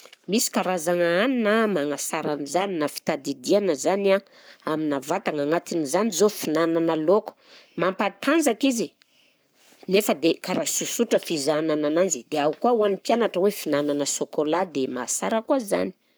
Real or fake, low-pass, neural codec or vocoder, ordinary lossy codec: fake; none; codec, 44.1 kHz, 7.8 kbps, Pupu-Codec; none